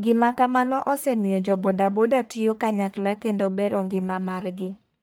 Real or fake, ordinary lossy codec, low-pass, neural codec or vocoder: fake; none; none; codec, 44.1 kHz, 1.7 kbps, Pupu-Codec